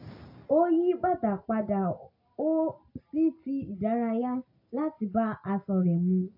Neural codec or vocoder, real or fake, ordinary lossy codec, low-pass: none; real; AAC, 32 kbps; 5.4 kHz